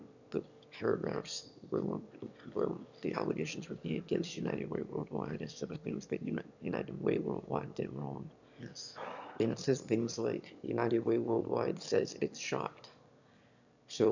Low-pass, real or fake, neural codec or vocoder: 7.2 kHz; fake; autoencoder, 22.05 kHz, a latent of 192 numbers a frame, VITS, trained on one speaker